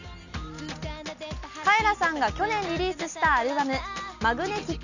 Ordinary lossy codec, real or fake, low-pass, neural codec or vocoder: none; real; 7.2 kHz; none